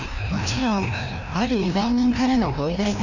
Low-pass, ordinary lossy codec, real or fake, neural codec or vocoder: 7.2 kHz; none; fake; codec, 16 kHz, 1 kbps, FreqCodec, larger model